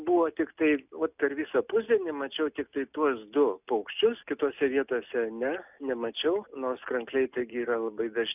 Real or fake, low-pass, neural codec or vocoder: real; 3.6 kHz; none